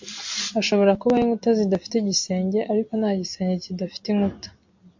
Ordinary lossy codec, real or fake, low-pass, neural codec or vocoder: MP3, 48 kbps; real; 7.2 kHz; none